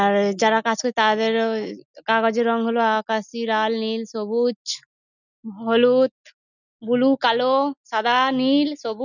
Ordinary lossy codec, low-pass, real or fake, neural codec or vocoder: none; 7.2 kHz; real; none